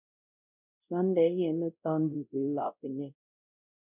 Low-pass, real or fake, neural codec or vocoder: 3.6 kHz; fake; codec, 16 kHz, 0.5 kbps, X-Codec, WavLM features, trained on Multilingual LibriSpeech